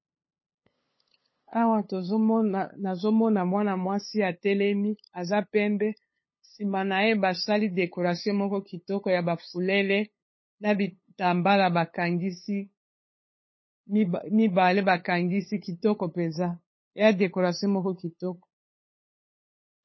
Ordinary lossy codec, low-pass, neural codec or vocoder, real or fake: MP3, 24 kbps; 7.2 kHz; codec, 16 kHz, 8 kbps, FunCodec, trained on LibriTTS, 25 frames a second; fake